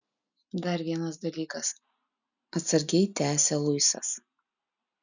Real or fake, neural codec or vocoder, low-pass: real; none; 7.2 kHz